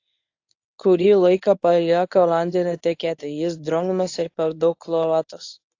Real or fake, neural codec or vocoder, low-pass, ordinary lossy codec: fake; codec, 24 kHz, 0.9 kbps, WavTokenizer, medium speech release version 1; 7.2 kHz; AAC, 48 kbps